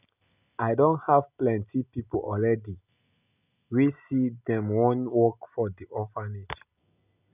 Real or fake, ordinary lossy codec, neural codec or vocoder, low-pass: real; none; none; 3.6 kHz